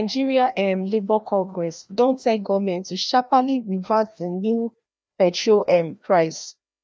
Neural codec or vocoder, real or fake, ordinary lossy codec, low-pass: codec, 16 kHz, 1 kbps, FreqCodec, larger model; fake; none; none